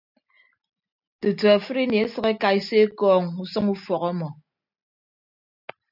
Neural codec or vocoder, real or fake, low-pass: none; real; 5.4 kHz